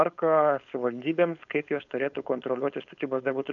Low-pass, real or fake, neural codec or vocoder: 7.2 kHz; fake; codec, 16 kHz, 4.8 kbps, FACodec